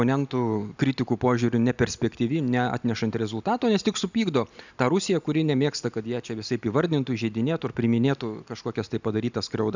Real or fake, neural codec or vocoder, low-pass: real; none; 7.2 kHz